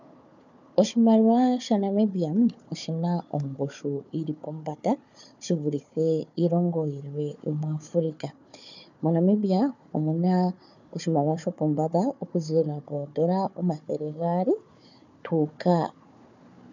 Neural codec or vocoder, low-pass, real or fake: codec, 16 kHz, 4 kbps, FunCodec, trained on Chinese and English, 50 frames a second; 7.2 kHz; fake